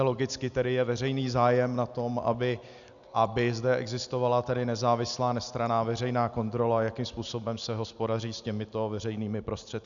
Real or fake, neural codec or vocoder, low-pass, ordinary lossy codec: real; none; 7.2 kHz; MP3, 96 kbps